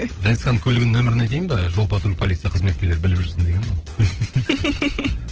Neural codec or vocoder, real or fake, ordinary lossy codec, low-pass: codec, 16 kHz, 16 kbps, FunCodec, trained on LibriTTS, 50 frames a second; fake; Opus, 16 kbps; 7.2 kHz